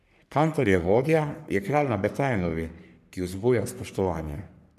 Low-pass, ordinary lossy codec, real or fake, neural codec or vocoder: 14.4 kHz; none; fake; codec, 44.1 kHz, 3.4 kbps, Pupu-Codec